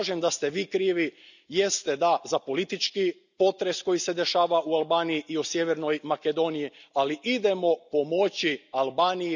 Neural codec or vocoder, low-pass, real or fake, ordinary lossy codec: none; 7.2 kHz; real; none